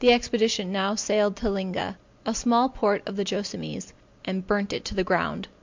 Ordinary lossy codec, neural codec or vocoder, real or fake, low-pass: MP3, 64 kbps; none; real; 7.2 kHz